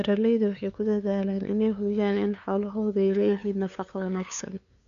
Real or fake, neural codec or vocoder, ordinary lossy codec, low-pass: fake; codec, 16 kHz, 2 kbps, FunCodec, trained on LibriTTS, 25 frames a second; AAC, 96 kbps; 7.2 kHz